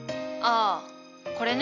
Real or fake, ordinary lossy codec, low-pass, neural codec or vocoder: real; none; 7.2 kHz; none